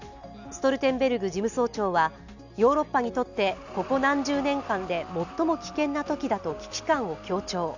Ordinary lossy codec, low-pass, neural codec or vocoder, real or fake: none; 7.2 kHz; none; real